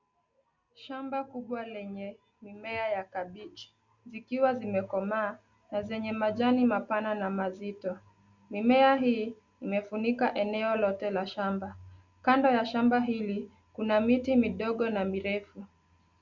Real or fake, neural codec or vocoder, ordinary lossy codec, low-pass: real; none; Opus, 64 kbps; 7.2 kHz